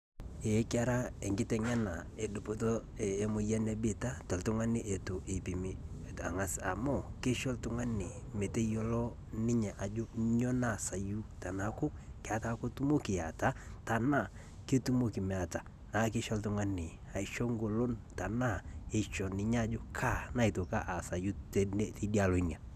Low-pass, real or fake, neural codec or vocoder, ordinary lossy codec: 14.4 kHz; real; none; none